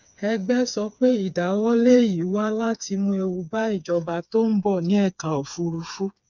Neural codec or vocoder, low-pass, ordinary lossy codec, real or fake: codec, 16 kHz, 4 kbps, FreqCodec, smaller model; 7.2 kHz; none; fake